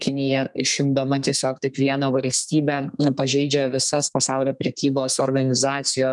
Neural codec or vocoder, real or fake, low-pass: codec, 32 kHz, 1.9 kbps, SNAC; fake; 10.8 kHz